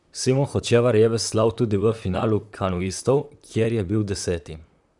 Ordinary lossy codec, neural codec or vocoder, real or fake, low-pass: none; vocoder, 44.1 kHz, 128 mel bands, Pupu-Vocoder; fake; 10.8 kHz